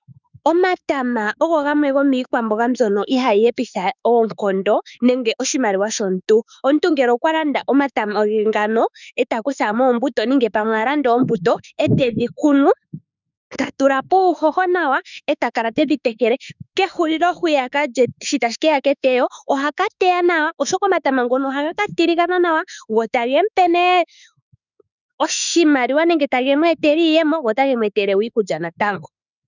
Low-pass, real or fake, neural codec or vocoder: 7.2 kHz; fake; autoencoder, 48 kHz, 32 numbers a frame, DAC-VAE, trained on Japanese speech